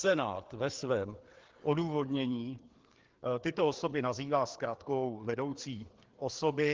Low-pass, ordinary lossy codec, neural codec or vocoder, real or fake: 7.2 kHz; Opus, 16 kbps; codec, 16 kHz, 4 kbps, FreqCodec, larger model; fake